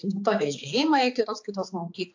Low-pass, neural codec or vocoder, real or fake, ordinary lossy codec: 7.2 kHz; codec, 16 kHz, 4 kbps, X-Codec, HuBERT features, trained on balanced general audio; fake; AAC, 48 kbps